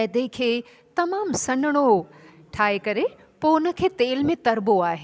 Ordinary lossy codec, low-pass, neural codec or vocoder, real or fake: none; none; none; real